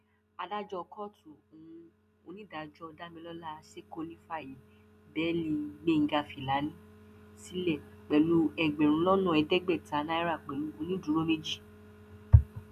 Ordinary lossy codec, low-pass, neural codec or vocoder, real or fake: none; 14.4 kHz; none; real